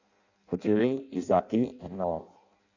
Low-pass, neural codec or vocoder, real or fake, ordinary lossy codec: 7.2 kHz; codec, 16 kHz in and 24 kHz out, 0.6 kbps, FireRedTTS-2 codec; fake; none